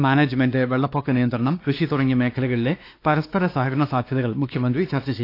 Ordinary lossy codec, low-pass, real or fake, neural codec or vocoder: AAC, 32 kbps; 5.4 kHz; fake; codec, 16 kHz, 2 kbps, X-Codec, WavLM features, trained on Multilingual LibriSpeech